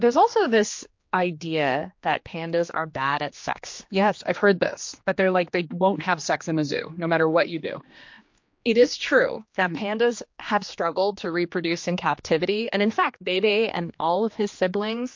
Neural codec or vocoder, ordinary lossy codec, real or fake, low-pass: codec, 16 kHz, 2 kbps, X-Codec, HuBERT features, trained on general audio; MP3, 48 kbps; fake; 7.2 kHz